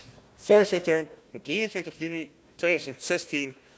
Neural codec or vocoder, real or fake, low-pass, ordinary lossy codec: codec, 16 kHz, 1 kbps, FunCodec, trained on Chinese and English, 50 frames a second; fake; none; none